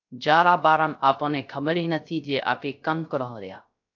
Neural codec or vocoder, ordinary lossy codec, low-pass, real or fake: codec, 16 kHz, 0.3 kbps, FocalCodec; AAC, 48 kbps; 7.2 kHz; fake